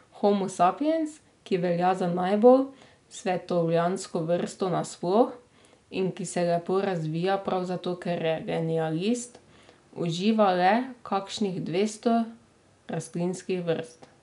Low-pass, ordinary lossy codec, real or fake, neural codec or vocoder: 10.8 kHz; none; real; none